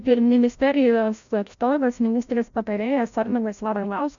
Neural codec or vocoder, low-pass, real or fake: codec, 16 kHz, 0.5 kbps, FreqCodec, larger model; 7.2 kHz; fake